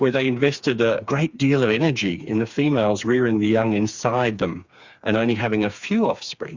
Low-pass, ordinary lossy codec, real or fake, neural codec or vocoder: 7.2 kHz; Opus, 64 kbps; fake; codec, 16 kHz, 4 kbps, FreqCodec, smaller model